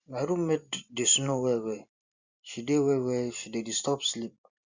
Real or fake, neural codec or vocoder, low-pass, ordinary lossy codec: fake; vocoder, 44.1 kHz, 128 mel bands every 512 samples, BigVGAN v2; 7.2 kHz; Opus, 64 kbps